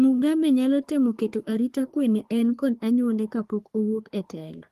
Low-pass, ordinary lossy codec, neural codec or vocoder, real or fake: 14.4 kHz; Opus, 24 kbps; codec, 44.1 kHz, 3.4 kbps, Pupu-Codec; fake